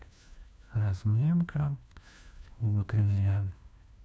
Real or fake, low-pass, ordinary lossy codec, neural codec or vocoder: fake; none; none; codec, 16 kHz, 1 kbps, FunCodec, trained on LibriTTS, 50 frames a second